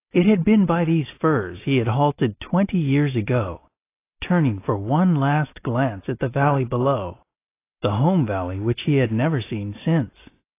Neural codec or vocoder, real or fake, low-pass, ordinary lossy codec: none; real; 3.6 kHz; AAC, 24 kbps